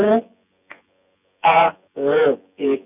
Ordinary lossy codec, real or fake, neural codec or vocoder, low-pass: none; fake; vocoder, 24 kHz, 100 mel bands, Vocos; 3.6 kHz